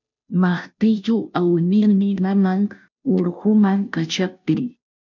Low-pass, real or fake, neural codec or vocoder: 7.2 kHz; fake; codec, 16 kHz, 0.5 kbps, FunCodec, trained on Chinese and English, 25 frames a second